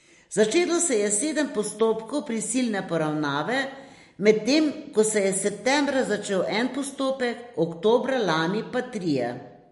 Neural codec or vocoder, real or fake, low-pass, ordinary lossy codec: none; real; 14.4 kHz; MP3, 48 kbps